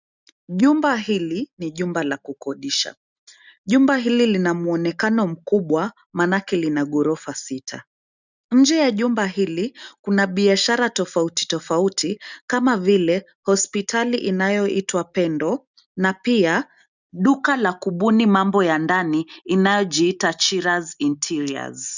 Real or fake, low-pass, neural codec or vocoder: real; 7.2 kHz; none